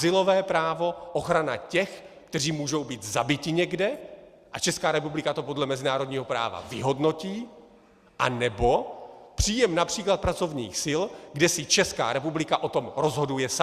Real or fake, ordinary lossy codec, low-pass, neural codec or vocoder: real; Opus, 64 kbps; 14.4 kHz; none